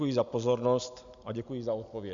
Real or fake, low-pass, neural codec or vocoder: real; 7.2 kHz; none